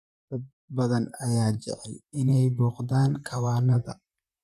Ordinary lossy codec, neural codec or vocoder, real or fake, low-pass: none; vocoder, 44.1 kHz, 128 mel bands, Pupu-Vocoder; fake; 14.4 kHz